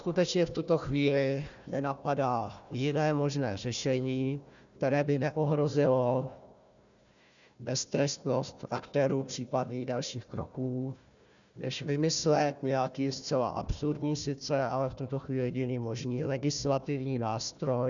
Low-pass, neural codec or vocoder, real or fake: 7.2 kHz; codec, 16 kHz, 1 kbps, FunCodec, trained on Chinese and English, 50 frames a second; fake